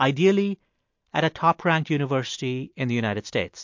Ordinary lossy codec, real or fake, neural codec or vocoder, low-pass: MP3, 48 kbps; real; none; 7.2 kHz